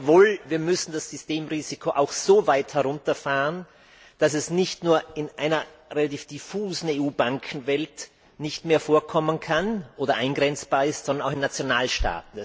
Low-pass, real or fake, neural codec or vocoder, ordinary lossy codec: none; real; none; none